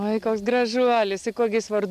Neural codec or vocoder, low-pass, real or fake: none; 14.4 kHz; real